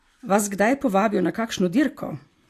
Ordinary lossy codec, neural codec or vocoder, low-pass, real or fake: AAC, 64 kbps; none; 14.4 kHz; real